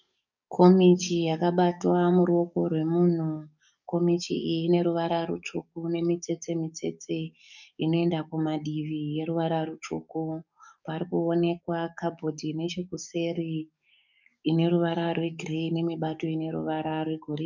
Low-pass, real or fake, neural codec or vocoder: 7.2 kHz; fake; codec, 44.1 kHz, 7.8 kbps, DAC